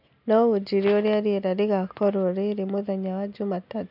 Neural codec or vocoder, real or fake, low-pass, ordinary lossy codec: none; real; 5.4 kHz; none